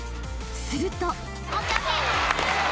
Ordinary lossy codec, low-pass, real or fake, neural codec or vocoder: none; none; real; none